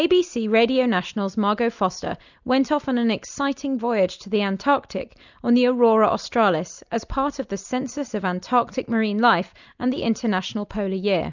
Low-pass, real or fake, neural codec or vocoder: 7.2 kHz; real; none